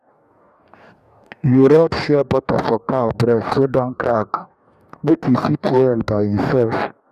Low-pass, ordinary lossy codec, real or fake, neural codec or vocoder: 14.4 kHz; none; fake; codec, 44.1 kHz, 2.6 kbps, DAC